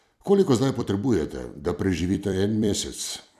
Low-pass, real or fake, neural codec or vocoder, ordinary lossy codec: 14.4 kHz; fake; vocoder, 44.1 kHz, 128 mel bands every 256 samples, BigVGAN v2; none